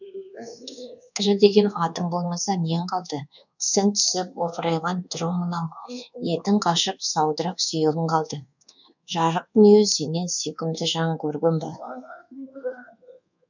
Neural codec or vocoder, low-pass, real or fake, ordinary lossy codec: codec, 24 kHz, 1.2 kbps, DualCodec; 7.2 kHz; fake; none